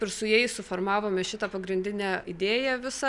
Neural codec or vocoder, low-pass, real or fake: none; 10.8 kHz; real